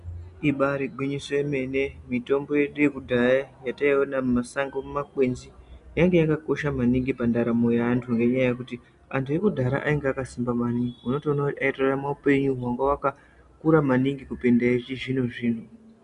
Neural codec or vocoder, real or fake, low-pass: none; real; 10.8 kHz